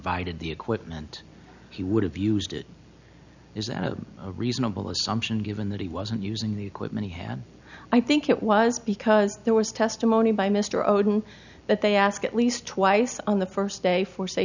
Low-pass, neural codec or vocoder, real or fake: 7.2 kHz; none; real